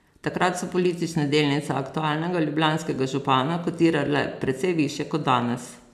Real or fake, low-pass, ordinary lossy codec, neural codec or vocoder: real; 14.4 kHz; none; none